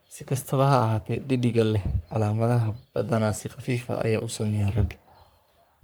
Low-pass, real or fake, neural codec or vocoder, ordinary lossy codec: none; fake; codec, 44.1 kHz, 3.4 kbps, Pupu-Codec; none